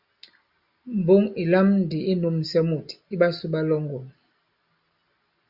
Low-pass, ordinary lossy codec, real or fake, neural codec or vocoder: 5.4 kHz; Opus, 64 kbps; real; none